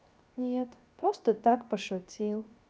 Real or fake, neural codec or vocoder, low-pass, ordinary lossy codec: fake; codec, 16 kHz, 0.7 kbps, FocalCodec; none; none